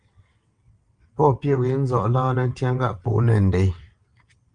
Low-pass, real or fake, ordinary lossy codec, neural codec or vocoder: 9.9 kHz; fake; Opus, 32 kbps; vocoder, 22.05 kHz, 80 mel bands, WaveNeXt